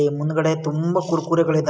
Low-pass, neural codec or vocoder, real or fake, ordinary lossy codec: none; none; real; none